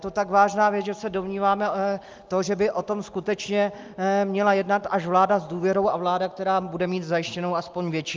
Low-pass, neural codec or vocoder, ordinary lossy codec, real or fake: 7.2 kHz; none; Opus, 24 kbps; real